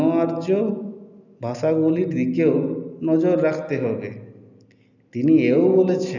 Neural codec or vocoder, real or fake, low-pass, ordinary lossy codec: none; real; 7.2 kHz; none